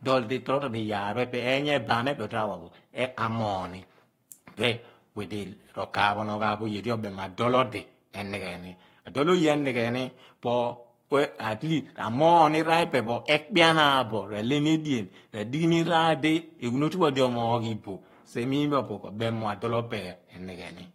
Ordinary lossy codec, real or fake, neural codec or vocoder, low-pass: AAC, 48 kbps; fake; codec, 44.1 kHz, 7.8 kbps, Pupu-Codec; 19.8 kHz